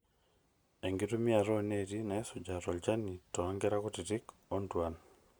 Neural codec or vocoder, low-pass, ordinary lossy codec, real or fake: none; none; none; real